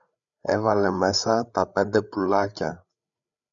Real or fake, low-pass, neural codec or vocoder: fake; 7.2 kHz; codec, 16 kHz, 8 kbps, FreqCodec, larger model